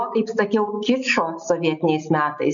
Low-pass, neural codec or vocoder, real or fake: 7.2 kHz; none; real